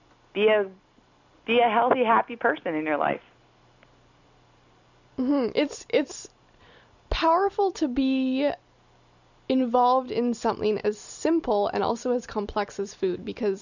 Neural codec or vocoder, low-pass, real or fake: none; 7.2 kHz; real